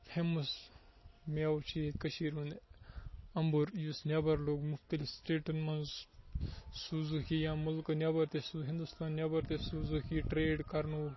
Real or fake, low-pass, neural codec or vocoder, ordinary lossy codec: real; 7.2 kHz; none; MP3, 24 kbps